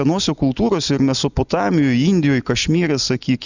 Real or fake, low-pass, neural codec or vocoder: real; 7.2 kHz; none